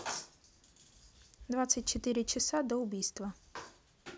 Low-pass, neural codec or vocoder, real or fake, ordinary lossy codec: none; none; real; none